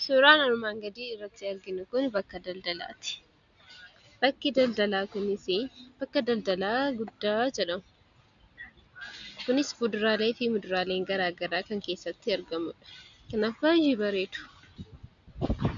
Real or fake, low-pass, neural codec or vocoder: real; 7.2 kHz; none